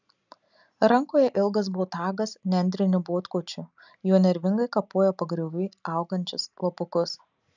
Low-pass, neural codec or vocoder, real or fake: 7.2 kHz; none; real